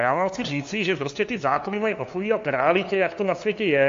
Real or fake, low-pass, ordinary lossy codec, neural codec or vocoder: fake; 7.2 kHz; AAC, 48 kbps; codec, 16 kHz, 2 kbps, FunCodec, trained on LibriTTS, 25 frames a second